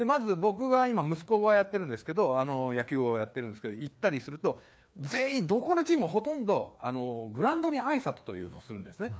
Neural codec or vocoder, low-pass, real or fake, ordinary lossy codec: codec, 16 kHz, 2 kbps, FreqCodec, larger model; none; fake; none